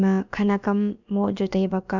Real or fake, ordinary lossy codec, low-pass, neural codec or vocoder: fake; none; 7.2 kHz; codec, 16 kHz, about 1 kbps, DyCAST, with the encoder's durations